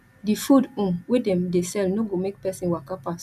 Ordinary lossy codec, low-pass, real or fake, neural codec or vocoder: none; 14.4 kHz; real; none